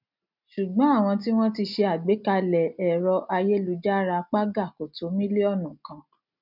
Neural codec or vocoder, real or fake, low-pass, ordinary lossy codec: none; real; 5.4 kHz; none